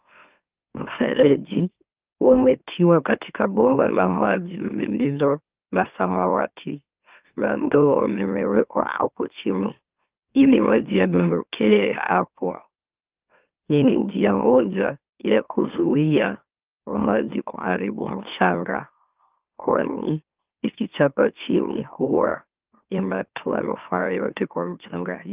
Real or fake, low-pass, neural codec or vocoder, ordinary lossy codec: fake; 3.6 kHz; autoencoder, 44.1 kHz, a latent of 192 numbers a frame, MeloTTS; Opus, 24 kbps